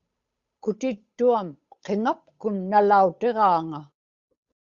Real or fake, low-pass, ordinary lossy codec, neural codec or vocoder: fake; 7.2 kHz; Opus, 64 kbps; codec, 16 kHz, 8 kbps, FunCodec, trained on Chinese and English, 25 frames a second